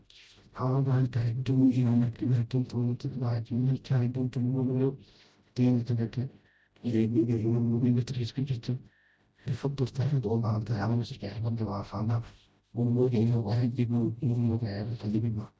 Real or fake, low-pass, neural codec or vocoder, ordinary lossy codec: fake; none; codec, 16 kHz, 0.5 kbps, FreqCodec, smaller model; none